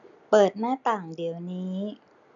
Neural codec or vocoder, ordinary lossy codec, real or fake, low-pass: none; none; real; 7.2 kHz